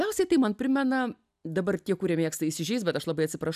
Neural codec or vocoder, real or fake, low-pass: none; real; 14.4 kHz